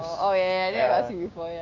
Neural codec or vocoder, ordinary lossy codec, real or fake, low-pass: codec, 16 kHz, 6 kbps, DAC; none; fake; 7.2 kHz